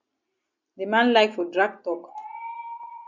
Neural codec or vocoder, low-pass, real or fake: none; 7.2 kHz; real